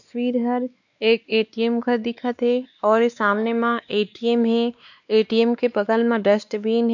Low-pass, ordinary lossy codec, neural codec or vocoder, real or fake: 7.2 kHz; none; codec, 16 kHz, 2 kbps, X-Codec, WavLM features, trained on Multilingual LibriSpeech; fake